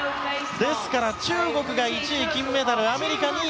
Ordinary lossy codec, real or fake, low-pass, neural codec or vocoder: none; real; none; none